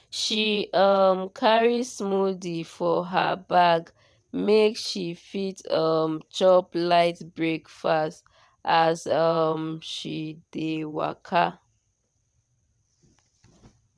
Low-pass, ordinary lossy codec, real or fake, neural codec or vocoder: none; none; fake; vocoder, 22.05 kHz, 80 mel bands, WaveNeXt